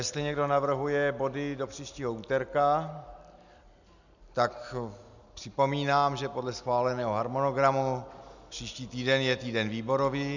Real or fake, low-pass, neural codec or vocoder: real; 7.2 kHz; none